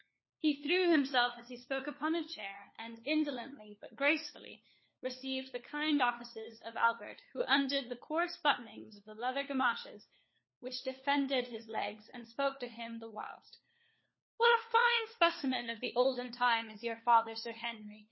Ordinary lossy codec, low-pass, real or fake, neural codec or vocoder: MP3, 24 kbps; 7.2 kHz; fake; codec, 16 kHz, 4 kbps, FunCodec, trained on LibriTTS, 50 frames a second